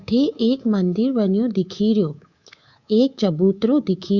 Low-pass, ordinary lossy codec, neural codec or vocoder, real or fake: 7.2 kHz; Opus, 64 kbps; codec, 24 kHz, 3.1 kbps, DualCodec; fake